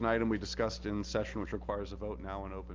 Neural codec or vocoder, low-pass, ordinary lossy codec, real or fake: none; 7.2 kHz; Opus, 32 kbps; real